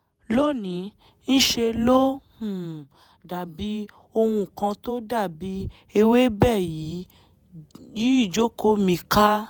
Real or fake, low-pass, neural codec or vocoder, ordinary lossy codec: fake; none; vocoder, 48 kHz, 128 mel bands, Vocos; none